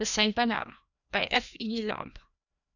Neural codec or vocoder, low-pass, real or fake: codec, 16 kHz, 1 kbps, FreqCodec, larger model; 7.2 kHz; fake